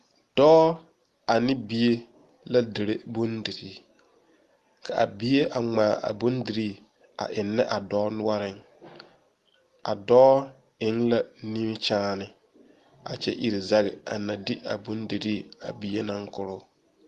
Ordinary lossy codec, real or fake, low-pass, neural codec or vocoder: Opus, 24 kbps; real; 14.4 kHz; none